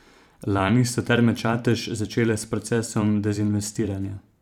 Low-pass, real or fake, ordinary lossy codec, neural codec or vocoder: 19.8 kHz; fake; none; vocoder, 44.1 kHz, 128 mel bands every 512 samples, BigVGAN v2